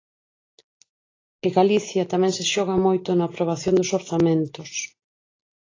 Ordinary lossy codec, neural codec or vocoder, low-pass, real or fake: AAC, 32 kbps; none; 7.2 kHz; real